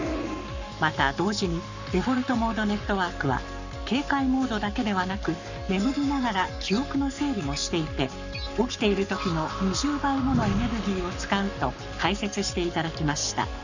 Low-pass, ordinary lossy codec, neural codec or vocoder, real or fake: 7.2 kHz; none; codec, 44.1 kHz, 7.8 kbps, Pupu-Codec; fake